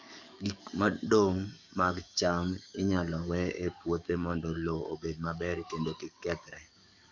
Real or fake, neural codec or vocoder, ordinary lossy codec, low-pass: fake; codec, 44.1 kHz, 7.8 kbps, DAC; none; 7.2 kHz